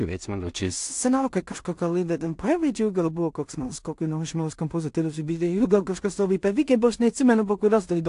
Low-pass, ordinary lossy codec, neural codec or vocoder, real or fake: 10.8 kHz; AAC, 64 kbps; codec, 16 kHz in and 24 kHz out, 0.4 kbps, LongCat-Audio-Codec, two codebook decoder; fake